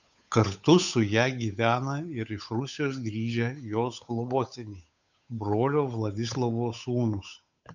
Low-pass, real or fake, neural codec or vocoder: 7.2 kHz; fake; codec, 16 kHz, 8 kbps, FunCodec, trained on Chinese and English, 25 frames a second